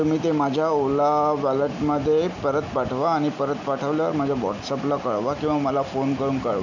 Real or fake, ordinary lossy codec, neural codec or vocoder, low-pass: real; none; none; 7.2 kHz